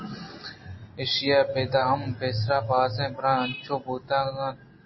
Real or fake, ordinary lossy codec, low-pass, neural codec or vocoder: real; MP3, 24 kbps; 7.2 kHz; none